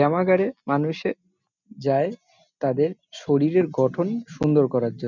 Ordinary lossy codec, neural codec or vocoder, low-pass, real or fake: none; none; 7.2 kHz; real